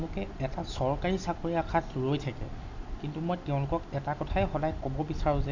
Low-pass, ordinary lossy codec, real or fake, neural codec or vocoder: 7.2 kHz; none; real; none